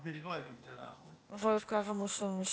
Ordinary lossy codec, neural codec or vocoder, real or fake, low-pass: none; codec, 16 kHz, 0.8 kbps, ZipCodec; fake; none